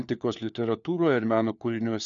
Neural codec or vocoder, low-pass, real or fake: codec, 16 kHz, 4 kbps, FunCodec, trained on LibriTTS, 50 frames a second; 7.2 kHz; fake